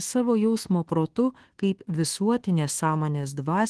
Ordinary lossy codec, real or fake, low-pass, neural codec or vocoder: Opus, 16 kbps; fake; 10.8 kHz; codec, 24 kHz, 1.2 kbps, DualCodec